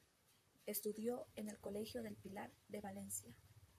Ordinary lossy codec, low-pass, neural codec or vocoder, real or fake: AAC, 96 kbps; 14.4 kHz; vocoder, 44.1 kHz, 128 mel bands, Pupu-Vocoder; fake